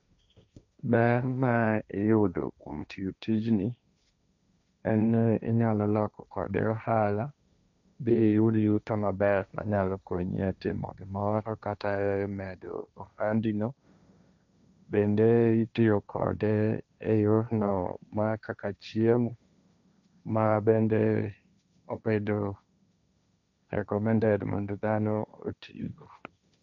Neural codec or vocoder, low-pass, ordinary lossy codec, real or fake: codec, 16 kHz, 1.1 kbps, Voila-Tokenizer; 7.2 kHz; none; fake